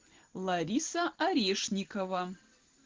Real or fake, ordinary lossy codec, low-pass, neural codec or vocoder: real; Opus, 16 kbps; 7.2 kHz; none